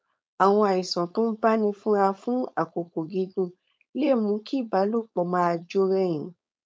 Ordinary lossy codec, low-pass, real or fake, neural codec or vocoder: none; none; fake; codec, 16 kHz, 4.8 kbps, FACodec